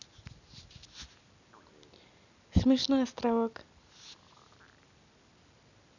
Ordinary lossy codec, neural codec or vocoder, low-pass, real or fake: none; none; 7.2 kHz; real